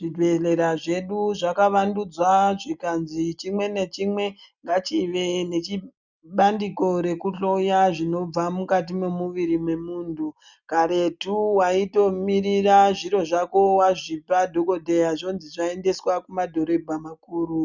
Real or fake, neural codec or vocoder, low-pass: real; none; 7.2 kHz